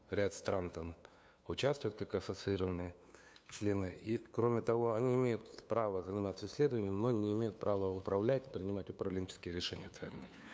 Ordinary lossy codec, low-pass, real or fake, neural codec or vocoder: none; none; fake; codec, 16 kHz, 2 kbps, FunCodec, trained on LibriTTS, 25 frames a second